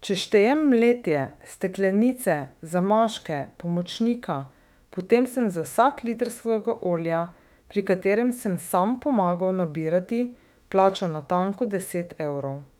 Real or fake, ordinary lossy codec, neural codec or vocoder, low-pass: fake; none; autoencoder, 48 kHz, 32 numbers a frame, DAC-VAE, trained on Japanese speech; 19.8 kHz